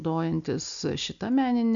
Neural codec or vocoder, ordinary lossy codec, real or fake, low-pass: none; MP3, 96 kbps; real; 7.2 kHz